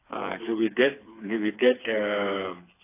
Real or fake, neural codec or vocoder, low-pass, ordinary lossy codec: fake; codec, 16 kHz, 4 kbps, FreqCodec, smaller model; 3.6 kHz; AAC, 24 kbps